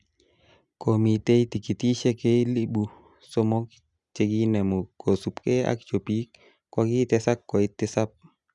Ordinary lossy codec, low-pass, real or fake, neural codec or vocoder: none; 10.8 kHz; real; none